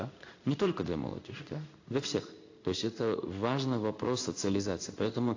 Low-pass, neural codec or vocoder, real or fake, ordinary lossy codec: 7.2 kHz; codec, 16 kHz in and 24 kHz out, 1 kbps, XY-Tokenizer; fake; AAC, 48 kbps